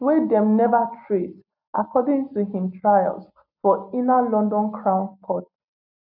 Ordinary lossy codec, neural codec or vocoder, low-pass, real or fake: none; none; 5.4 kHz; real